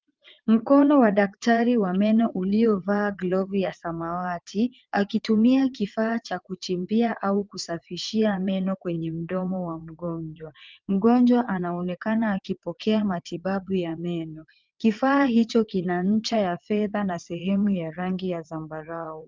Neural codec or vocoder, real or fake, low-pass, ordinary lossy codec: vocoder, 22.05 kHz, 80 mel bands, WaveNeXt; fake; 7.2 kHz; Opus, 32 kbps